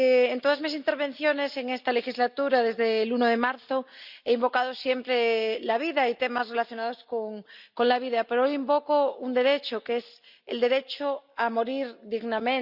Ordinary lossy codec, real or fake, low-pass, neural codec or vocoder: Opus, 64 kbps; real; 5.4 kHz; none